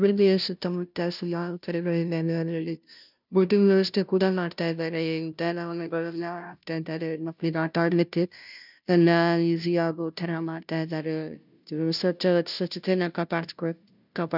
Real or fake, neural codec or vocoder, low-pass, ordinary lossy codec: fake; codec, 16 kHz, 0.5 kbps, FunCodec, trained on Chinese and English, 25 frames a second; 5.4 kHz; none